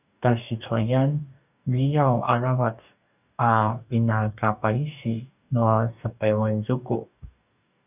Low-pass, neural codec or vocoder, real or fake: 3.6 kHz; codec, 44.1 kHz, 2.6 kbps, DAC; fake